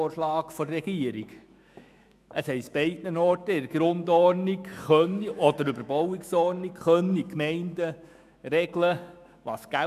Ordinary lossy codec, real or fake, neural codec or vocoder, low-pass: none; fake; autoencoder, 48 kHz, 128 numbers a frame, DAC-VAE, trained on Japanese speech; 14.4 kHz